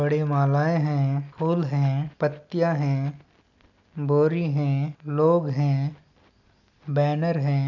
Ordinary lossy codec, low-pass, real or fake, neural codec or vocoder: none; 7.2 kHz; real; none